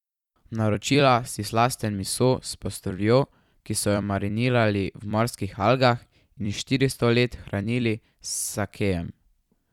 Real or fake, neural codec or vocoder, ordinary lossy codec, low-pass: fake; vocoder, 44.1 kHz, 128 mel bands every 256 samples, BigVGAN v2; none; 19.8 kHz